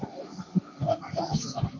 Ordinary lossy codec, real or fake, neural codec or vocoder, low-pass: AAC, 48 kbps; fake; codec, 16 kHz, 1.1 kbps, Voila-Tokenizer; 7.2 kHz